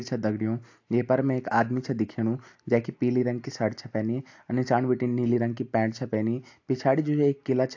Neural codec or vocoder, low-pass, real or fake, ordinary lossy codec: none; 7.2 kHz; real; AAC, 48 kbps